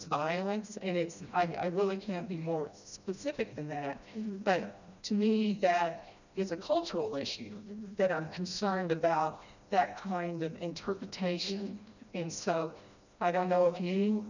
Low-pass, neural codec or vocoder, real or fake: 7.2 kHz; codec, 16 kHz, 1 kbps, FreqCodec, smaller model; fake